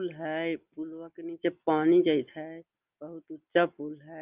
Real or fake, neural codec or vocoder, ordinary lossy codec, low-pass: real; none; Opus, 24 kbps; 3.6 kHz